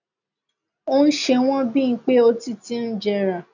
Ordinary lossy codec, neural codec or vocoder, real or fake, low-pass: none; none; real; 7.2 kHz